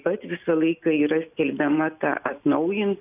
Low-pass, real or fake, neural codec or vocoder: 3.6 kHz; real; none